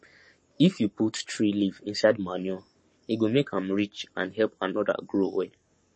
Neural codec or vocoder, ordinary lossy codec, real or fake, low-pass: codec, 44.1 kHz, 7.8 kbps, Pupu-Codec; MP3, 32 kbps; fake; 10.8 kHz